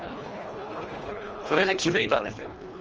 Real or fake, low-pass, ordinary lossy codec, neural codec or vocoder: fake; 7.2 kHz; Opus, 24 kbps; codec, 24 kHz, 1.5 kbps, HILCodec